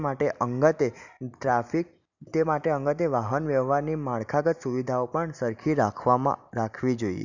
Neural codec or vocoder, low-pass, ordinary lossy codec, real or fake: none; 7.2 kHz; none; real